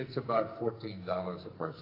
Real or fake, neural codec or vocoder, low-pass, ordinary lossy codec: fake; codec, 44.1 kHz, 2.6 kbps, SNAC; 5.4 kHz; AAC, 32 kbps